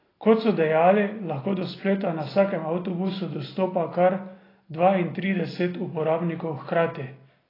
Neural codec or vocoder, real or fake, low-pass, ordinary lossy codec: none; real; 5.4 kHz; AAC, 24 kbps